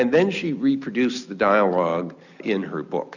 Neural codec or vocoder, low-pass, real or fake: none; 7.2 kHz; real